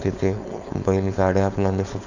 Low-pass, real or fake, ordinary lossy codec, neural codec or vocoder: 7.2 kHz; fake; none; codec, 16 kHz, 4.8 kbps, FACodec